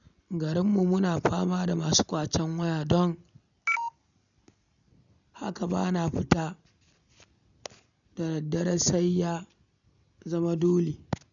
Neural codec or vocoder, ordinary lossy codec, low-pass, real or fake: none; none; 7.2 kHz; real